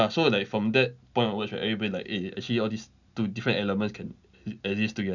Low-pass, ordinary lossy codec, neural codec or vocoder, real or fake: 7.2 kHz; none; none; real